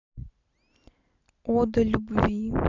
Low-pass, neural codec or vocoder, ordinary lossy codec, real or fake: 7.2 kHz; none; none; real